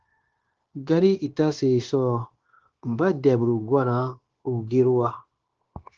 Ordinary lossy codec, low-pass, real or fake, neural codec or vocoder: Opus, 16 kbps; 7.2 kHz; fake; codec, 16 kHz, 0.9 kbps, LongCat-Audio-Codec